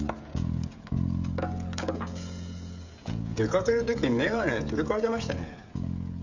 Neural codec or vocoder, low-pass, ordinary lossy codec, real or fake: codec, 16 kHz, 16 kbps, FreqCodec, smaller model; 7.2 kHz; none; fake